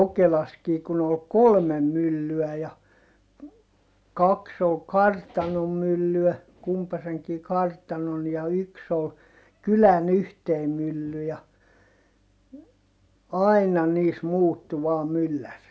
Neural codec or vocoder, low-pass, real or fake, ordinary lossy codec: none; none; real; none